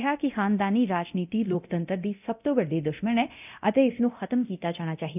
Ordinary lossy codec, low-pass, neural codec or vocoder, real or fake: none; 3.6 kHz; codec, 24 kHz, 0.9 kbps, DualCodec; fake